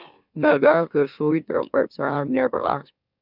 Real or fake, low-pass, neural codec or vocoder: fake; 5.4 kHz; autoencoder, 44.1 kHz, a latent of 192 numbers a frame, MeloTTS